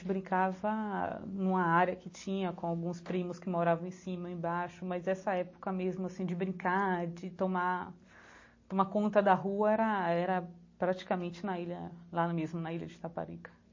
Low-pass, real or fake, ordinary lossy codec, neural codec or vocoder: 7.2 kHz; real; MP3, 32 kbps; none